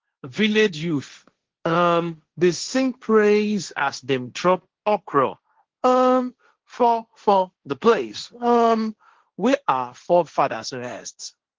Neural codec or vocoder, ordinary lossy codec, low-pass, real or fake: codec, 16 kHz, 1.1 kbps, Voila-Tokenizer; Opus, 16 kbps; 7.2 kHz; fake